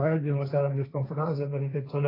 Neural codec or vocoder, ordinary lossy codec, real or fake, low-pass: codec, 16 kHz, 1.1 kbps, Voila-Tokenizer; AAC, 24 kbps; fake; 5.4 kHz